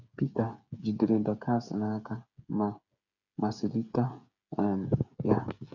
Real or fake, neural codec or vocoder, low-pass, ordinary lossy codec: fake; codec, 16 kHz, 8 kbps, FreqCodec, smaller model; 7.2 kHz; none